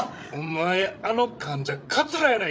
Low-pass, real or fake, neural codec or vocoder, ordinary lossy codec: none; fake; codec, 16 kHz, 8 kbps, FreqCodec, larger model; none